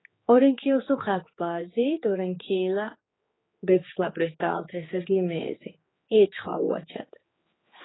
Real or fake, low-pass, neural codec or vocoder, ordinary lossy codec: fake; 7.2 kHz; codec, 16 kHz, 4 kbps, X-Codec, HuBERT features, trained on general audio; AAC, 16 kbps